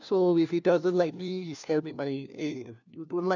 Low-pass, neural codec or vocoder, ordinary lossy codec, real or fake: 7.2 kHz; codec, 16 kHz, 1 kbps, FunCodec, trained on LibriTTS, 50 frames a second; none; fake